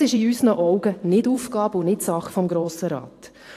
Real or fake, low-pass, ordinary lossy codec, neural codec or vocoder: fake; 14.4 kHz; AAC, 64 kbps; vocoder, 44.1 kHz, 128 mel bands every 512 samples, BigVGAN v2